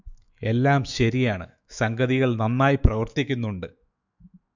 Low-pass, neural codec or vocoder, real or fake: 7.2 kHz; autoencoder, 48 kHz, 128 numbers a frame, DAC-VAE, trained on Japanese speech; fake